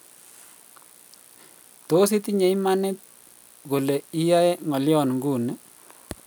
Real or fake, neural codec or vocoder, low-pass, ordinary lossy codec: real; none; none; none